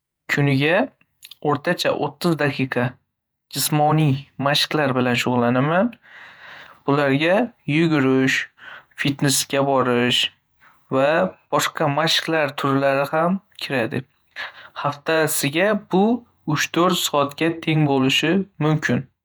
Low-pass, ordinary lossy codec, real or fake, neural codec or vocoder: none; none; fake; vocoder, 48 kHz, 128 mel bands, Vocos